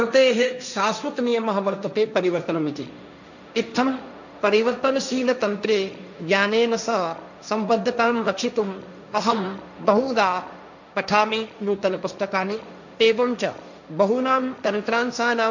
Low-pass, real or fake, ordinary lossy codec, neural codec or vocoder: 7.2 kHz; fake; none; codec, 16 kHz, 1.1 kbps, Voila-Tokenizer